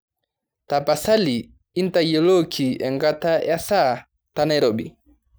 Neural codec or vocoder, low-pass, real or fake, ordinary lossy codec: none; none; real; none